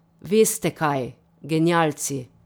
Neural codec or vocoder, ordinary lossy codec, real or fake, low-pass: none; none; real; none